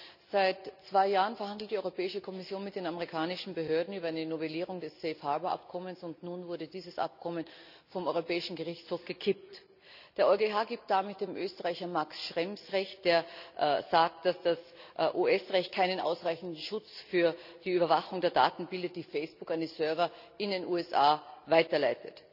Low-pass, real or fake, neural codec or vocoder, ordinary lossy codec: 5.4 kHz; real; none; none